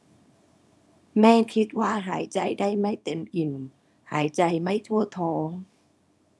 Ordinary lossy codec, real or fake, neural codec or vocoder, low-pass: none; fake; codec, 24 kHz, 0.9 kbps, WavTokenizer, small release; none